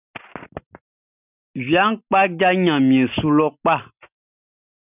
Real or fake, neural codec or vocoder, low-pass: real; none; 3.6 kHz